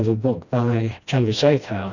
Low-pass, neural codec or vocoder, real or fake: 7.2 kHz; codec, 16 kHz, 1 kbps, FreqCodec, smaller model; fake